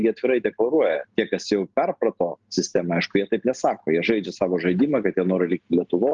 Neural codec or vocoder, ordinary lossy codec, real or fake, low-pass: none; Opus, 32 kbps; real; 7.2 kHz